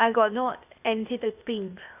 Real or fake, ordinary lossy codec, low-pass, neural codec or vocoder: fake; none; 3.6 kHz; codec, 16 kHz, 0.8 kbps, ZipCodec